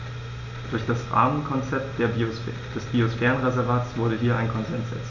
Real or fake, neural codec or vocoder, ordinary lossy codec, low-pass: real; none; none; 7.2 kHz